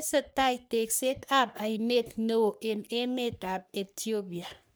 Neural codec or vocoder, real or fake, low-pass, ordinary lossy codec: codec, 44.1 kHz, 3.4 kbps, Pupu-Codec; fake; none; none